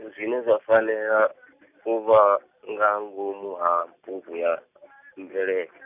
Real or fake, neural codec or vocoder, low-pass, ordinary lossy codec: real; none; 3.6 kHz; none